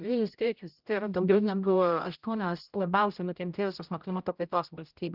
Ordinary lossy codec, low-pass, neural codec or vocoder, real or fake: Opus, 32 kbps; 5.4 kHz; codec, 16 kHz, 0.5 kbps, X-Codec, HuBERT features, trained on general audio; fake